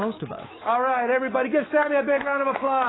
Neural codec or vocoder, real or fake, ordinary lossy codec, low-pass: autoencoder, 48 kHz, 128 numbers a frame, DAC-VAE, trained on Japanese speech; fake; AAC, 16 kbps; 7.2 kHz